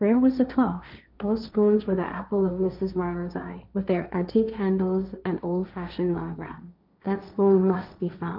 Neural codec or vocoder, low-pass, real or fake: codec, 16 kHz, 1.1 kbps, Voila-Tokenizer; 5.4 kHz; fake